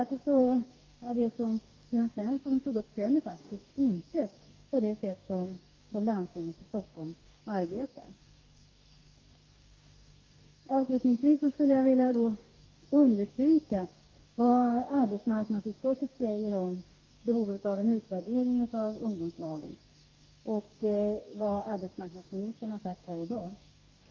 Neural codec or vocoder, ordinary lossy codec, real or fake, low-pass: codec, 44.1 kHz, 2.6 kbps, DAC; Opus, 24 kbps; fake; 7.2 kHz